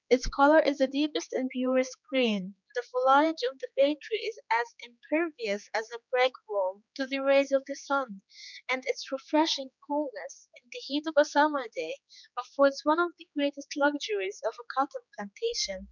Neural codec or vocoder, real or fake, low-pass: codec, 16 kHz, 4 kbps, X-Codec, HuBERT features, trained on general audio; fake; 7.2 kHz